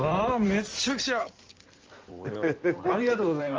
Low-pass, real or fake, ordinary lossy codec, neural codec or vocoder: 7.2 kHz; fake; Opus, 24 kbps; codec, 16 kHz in and 24 kHz out, 2.2 kbps, FireRedTTS-2 codec